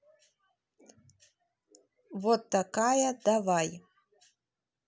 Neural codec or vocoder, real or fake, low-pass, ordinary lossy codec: none; real; none; none